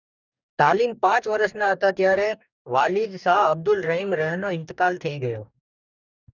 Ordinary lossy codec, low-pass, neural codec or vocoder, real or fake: none; 7.2 kHz; codec, 44.1 kHz, 2.6 kbps, DAC; fake